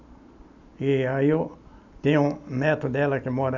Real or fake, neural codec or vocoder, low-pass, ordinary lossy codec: real; none; 7.2 kHz; none